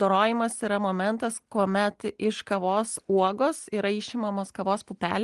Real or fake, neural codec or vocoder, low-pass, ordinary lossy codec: real; none; 10.8 kHz; Opus, 24 kbps